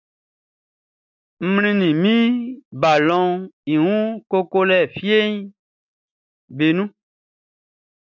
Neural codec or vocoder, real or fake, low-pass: none; real; 7.2 kHz